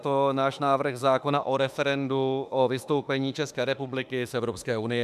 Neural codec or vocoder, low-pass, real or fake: autoencoder, 48 kHz, 32 numbers a frame, DAC-VAE, trained on Japanese speech; 14.4 kHz; fake